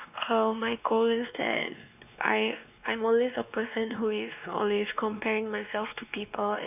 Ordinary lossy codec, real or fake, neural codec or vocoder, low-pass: none; fake; codec, 16 kHz, 2 kbps, X-Codec, WavLM features, trained on Multilingual LibriSpeech; 3.6 kHz